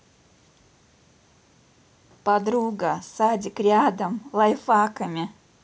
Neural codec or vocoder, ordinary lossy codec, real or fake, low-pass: none; none; real; none